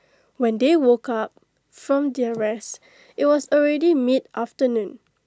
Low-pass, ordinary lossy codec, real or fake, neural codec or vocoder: none; none; real; none